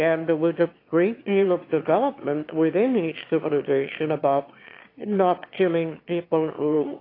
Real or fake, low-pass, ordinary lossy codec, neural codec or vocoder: fake; 5.4 kHz; AAC, 32 kbps; autoencoder, 22.05 kHz, a latent of 192 numbers a frame, VITS, trained on one speaker